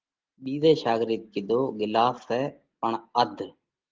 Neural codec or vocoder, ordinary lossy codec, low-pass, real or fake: none; Opus, 16 kbps; 7.2 kHz; real